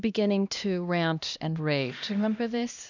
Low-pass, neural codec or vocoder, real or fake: 7.2 kHz; codec, 16 kHz, 1 kbps, X-Codec, HuBERT features, trained on LibriSpeech; fake